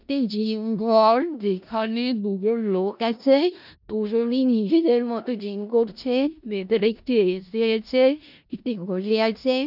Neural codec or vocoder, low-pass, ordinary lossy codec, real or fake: codec, 16 kHz in and 24 kHz out, 0.4 kbps, LongCat-Audio-Codec, four codebook decoder; 5.4 kHz; none; fake